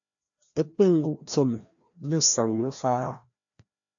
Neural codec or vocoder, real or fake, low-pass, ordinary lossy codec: codec, 16 kHz, 1 kbps, FreqCodec, larger model; fake; 7.2 kHz; AAC, 64 kbps